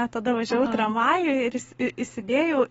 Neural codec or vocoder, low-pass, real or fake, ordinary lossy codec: codec, 44.1 kHz, 7.8 kbps, Pupu-Codec; 19.8 kHz; fake; AAC, 24 kbps